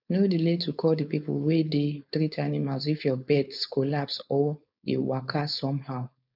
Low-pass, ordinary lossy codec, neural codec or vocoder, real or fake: 5.4 kHz; none; codec, 16 kHz, 4.8 kbps, FACodec; fake